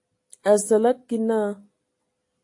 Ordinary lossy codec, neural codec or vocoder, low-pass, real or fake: AAC, 48 kbps; none; 10.8 kHz; real